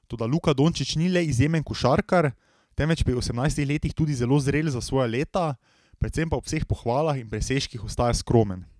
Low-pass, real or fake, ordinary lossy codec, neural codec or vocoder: none; real; none; none